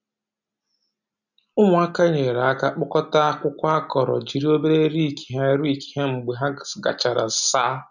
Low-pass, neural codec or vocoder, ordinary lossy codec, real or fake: 7.2 kHz; none; none; real